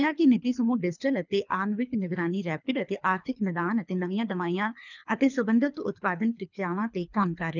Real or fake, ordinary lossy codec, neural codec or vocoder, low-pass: fake; none; codec, 24 kHz, 3 kbps, HILCodec; 7.2 kHz